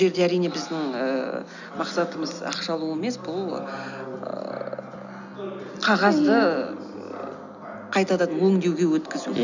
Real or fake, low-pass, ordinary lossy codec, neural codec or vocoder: real; 7.2 kHz; none; none